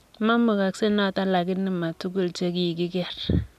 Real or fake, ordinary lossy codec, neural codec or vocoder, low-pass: real; none; none; 14.4 kHz